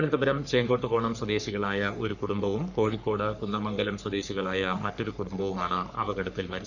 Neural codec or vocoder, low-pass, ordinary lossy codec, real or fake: codec, 44.1 kHz, 3.4 kbps, Pupu-Codec; 7.2 kHz; none; fake